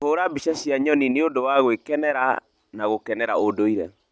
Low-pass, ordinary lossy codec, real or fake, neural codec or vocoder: none; none; real; none